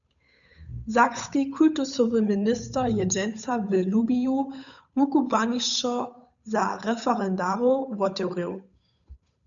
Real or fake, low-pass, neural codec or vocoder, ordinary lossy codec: fake; 7.2 kHz; codec, 16 kHz, 8 kbps, FunCodec, trained on Chinese and English, 25 frames a second; MP3, 96 kbps